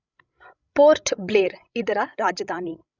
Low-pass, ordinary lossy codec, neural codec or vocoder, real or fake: 7.2 kHz; none; codec, 16 kHz, 16 kbps, FreqCodec, larger model; fake